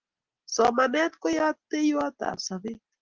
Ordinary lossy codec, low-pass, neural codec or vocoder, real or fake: Opus, 16 kbps; 7.2 kHz; none; real